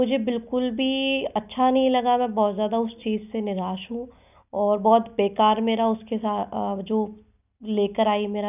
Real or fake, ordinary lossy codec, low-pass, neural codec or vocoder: real; none; 3.6 kHz; none